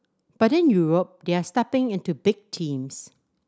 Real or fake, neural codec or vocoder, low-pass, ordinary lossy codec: real; none; none; none